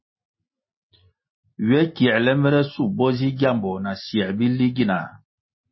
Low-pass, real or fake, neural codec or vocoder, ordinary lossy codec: 7.2 kHz; real; none; MP3, 24 kbps